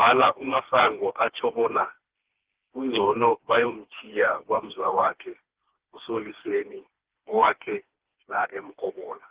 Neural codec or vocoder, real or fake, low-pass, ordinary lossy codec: codec, 16 kHz, 2 kbps, FreqCodec, smaller model; fake; 3.6 kHz; Opus, 16 kbps